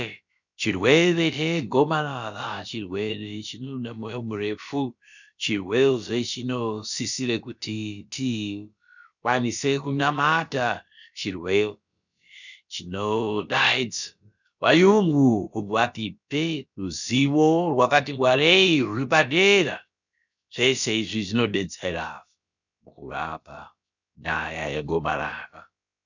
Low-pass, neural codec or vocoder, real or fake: 7.2 kHz; codec, 16 kHz, about 1 kbps, DyCAST, with the encoder's durations; fake